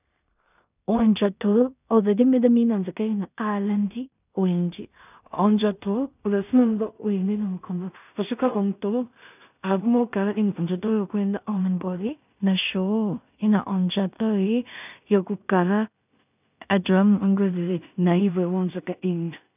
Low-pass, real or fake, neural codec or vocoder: 3.6 kHz; fake; codec, 16 kHz in and 24 kHz out, 0.4 kbps, LongCat-Audio-Codec, two codebook decoder